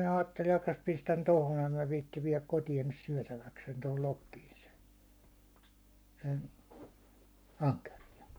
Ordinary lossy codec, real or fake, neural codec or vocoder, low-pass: none; fake; codec, 44.1 kHz, 7.8 kbps, Pupu-Codec; none